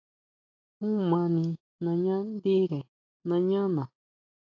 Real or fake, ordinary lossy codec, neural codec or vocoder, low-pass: real; AAC, 48 kbps; none; 7.2 kHz